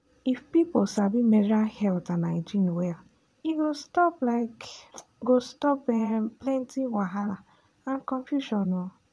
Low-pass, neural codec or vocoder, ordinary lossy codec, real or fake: none; vocoder, 22.05 kHz, 80 mel bands, Vocos; none; fake